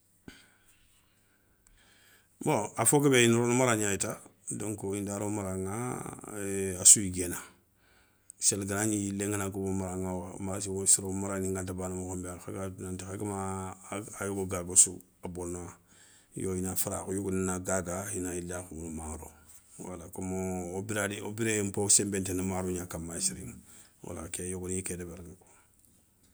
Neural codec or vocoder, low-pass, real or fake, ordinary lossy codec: none; none; real; none